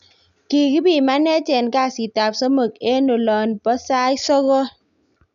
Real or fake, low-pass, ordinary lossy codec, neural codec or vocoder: real; 7.2 kHz; none; none